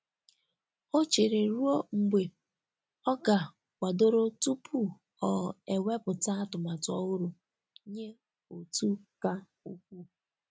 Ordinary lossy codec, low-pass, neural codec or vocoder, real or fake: none; none; none; real